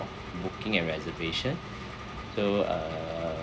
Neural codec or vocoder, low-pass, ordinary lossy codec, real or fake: none; none; none; real